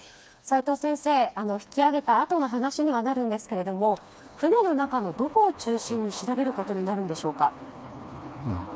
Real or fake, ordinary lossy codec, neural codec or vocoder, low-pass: fake; none; codec, 16 kHz, 2 kbps, FreqCodec, smaller model; none